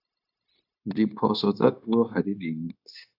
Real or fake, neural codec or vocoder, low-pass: fake; codec, 16 kHz, 0.9 kbps, LongCat-Audio-Codec; 5.4 kHz